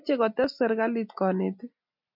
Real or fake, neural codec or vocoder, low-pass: real; none; 5.4 kHz